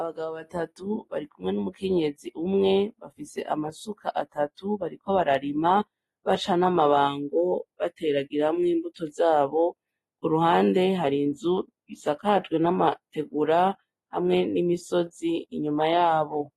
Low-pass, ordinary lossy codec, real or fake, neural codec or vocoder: 19.8 kHz; AAC, 32 kbps; real; none